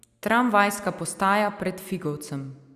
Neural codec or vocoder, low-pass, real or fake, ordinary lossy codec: none; 14.4 kHz; real; Opus, 64 kbps